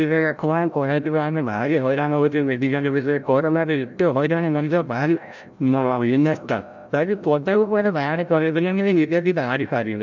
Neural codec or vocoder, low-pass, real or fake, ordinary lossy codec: codec, 16 kHz, 0.5 kbps, FreqCodec, larger model; 7.2 kHz; fake; none